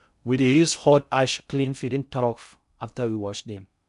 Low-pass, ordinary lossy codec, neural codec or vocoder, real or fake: 10.8 kHz; none; codec, 16 kHz in and 24 kHz out, 0.6 kbps, FocalCodec, streaming, 2048 codes; fake